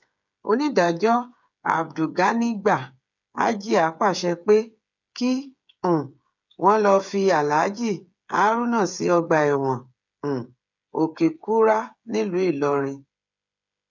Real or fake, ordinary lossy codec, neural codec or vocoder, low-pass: fake; none; codec, 16 kHz, 8 kbps, FreqCodec, smaller model; 7.2 kHz